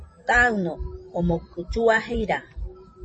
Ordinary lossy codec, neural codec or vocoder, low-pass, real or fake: MP3, 32 kbps; vocoder, 44.1 kHz, 128 mel bands every 512 samples, BigVGAN v2; 10.8 kHz; fake